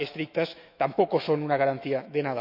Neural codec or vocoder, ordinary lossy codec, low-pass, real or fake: autoencoder, 48 kHz, 128 numbers a frame, DAC-VAE, trained on Japanese speech; AAC, 48 kbps; 5.4 kHz; fake